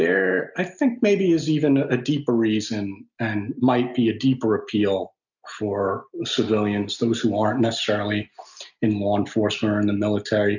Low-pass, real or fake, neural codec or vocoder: 7.2 kHz; real; none